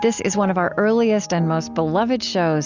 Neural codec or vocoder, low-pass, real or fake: none; 7.2 kHz; real